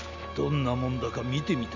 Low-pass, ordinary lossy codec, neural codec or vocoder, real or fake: 7.2 kHz; none; none; real